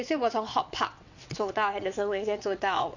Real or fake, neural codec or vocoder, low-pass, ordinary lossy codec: fake; vocoder, 22.05 kHz, 80 mel bands, WaveNeXt; 7.2 kHz; none